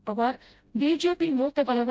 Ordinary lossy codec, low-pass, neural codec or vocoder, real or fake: none; none; codec, 16 kHz, 0.5 kbps, FreqCodec, smaller model; fake